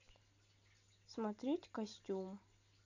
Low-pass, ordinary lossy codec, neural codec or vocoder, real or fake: 7.2 kHz; none; none; real